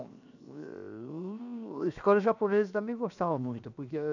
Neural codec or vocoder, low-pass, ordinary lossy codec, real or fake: codec, 16 kHz, 0.7 kbps, FocalCodec; 7.2 kHz; none; fake